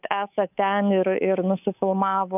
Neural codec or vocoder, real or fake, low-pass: codec, 24 kHz, 3.1 kbps, DualCodec; fake; 3.6 kHz